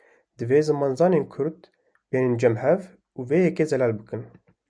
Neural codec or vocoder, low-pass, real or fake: none; 9.9 kHz; real